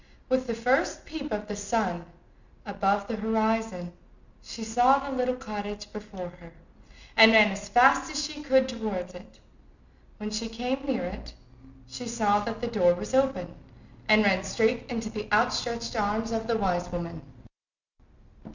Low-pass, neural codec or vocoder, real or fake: 7.2 kHz; none; real